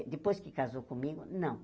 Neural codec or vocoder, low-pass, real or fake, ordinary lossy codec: none; none; real; none